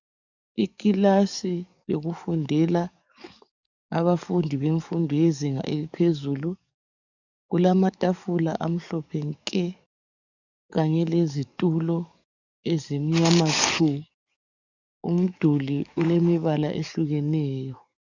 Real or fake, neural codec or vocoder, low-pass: fake; codec, 44.1 kHz, 7.8 kbps, DAC; 7.2 kHz